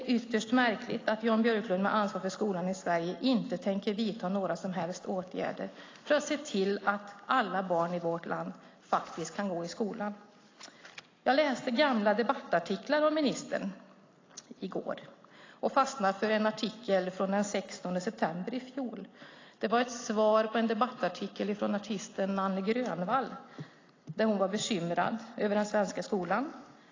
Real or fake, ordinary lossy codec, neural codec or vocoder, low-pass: real; AAC, 32 kbps; none; 7.2 kHz